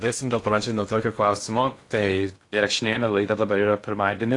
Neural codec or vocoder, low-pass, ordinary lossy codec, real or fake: codec, 16 kHz in and 24 kHz out, 0.6 kbps, FocalCodec, streaming, 2048 codes; 10.8 kHz; AAC, 48 kbps; fake